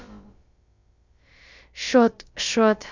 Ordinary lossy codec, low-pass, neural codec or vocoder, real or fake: Opus, 64 kbps; 7.2 kHz; codec, 16 kHz, about 1 kbps, DyCAST, with the encoder's durations; fake